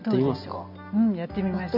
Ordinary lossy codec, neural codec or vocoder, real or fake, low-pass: none; none; real; 5.4 kHz